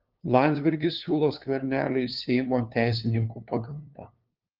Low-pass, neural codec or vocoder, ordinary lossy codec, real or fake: 5.4 kHz; codec, 16 kHz, 2 kbps, FunCodec, trained on LibriTTS, 25 frames a second; Opus, 32 kbps; fake